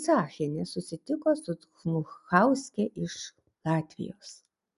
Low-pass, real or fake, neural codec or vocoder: 10.8 kHz; real; none